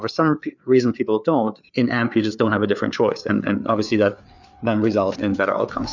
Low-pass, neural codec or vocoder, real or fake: 7.2 kHz; codec, 16 kHz, 4 kbps, FreqCodec, larger model; fake